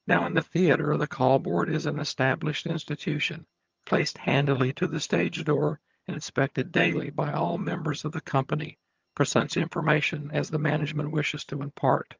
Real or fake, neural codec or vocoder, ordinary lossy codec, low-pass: fake; vocoder, 22.05 kHz, 80 mel bands, HiFi-GAN; Opus, 24 kbps; 7.2 kHz